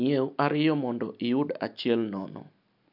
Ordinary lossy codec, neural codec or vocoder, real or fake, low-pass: none; none; real; 5.4 kHz